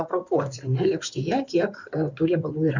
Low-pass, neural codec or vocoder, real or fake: 7.2 kHz; codec, 16 kHz in and 24 kHz out, 2.2 kbps, FireRedTTS-2 codec; fake